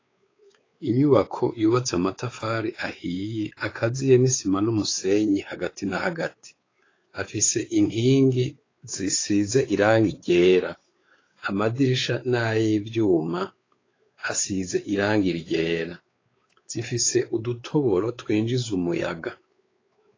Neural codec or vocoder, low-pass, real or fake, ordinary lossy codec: codec, 16 kHz, 4 kbps, X-Codec, WavLM features, trained on Multilingual LibriSpeech; 7.2 kHz; fake; AAC, 32 kbps